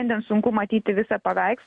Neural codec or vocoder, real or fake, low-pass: none; real; 10.8 kHz